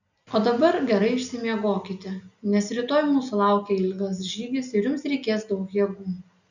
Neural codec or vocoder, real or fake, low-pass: none; real; 7.2 kHz